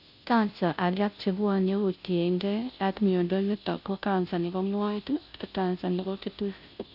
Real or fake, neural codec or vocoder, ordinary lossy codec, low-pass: fake; codec, 16 kHz, 0.5 kbps, FunCodec, trained on Chinese and English, 25 frames a second; none; 5.4 kHz